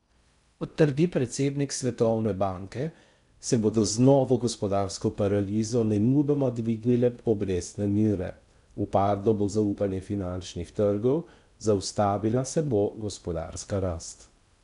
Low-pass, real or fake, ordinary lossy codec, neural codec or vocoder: 10.8 kHz; fake; none; codec, 16 kHz in and 24 kHz out, 0.6 kbps, FocalCodec, streaming, 4096 codes